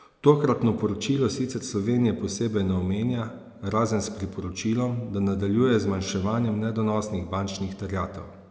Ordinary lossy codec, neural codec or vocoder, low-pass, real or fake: none; none; none; real